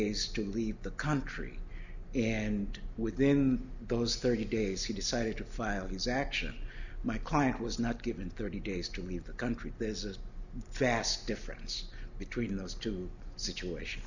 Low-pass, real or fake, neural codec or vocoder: 7.2 kHz; real; none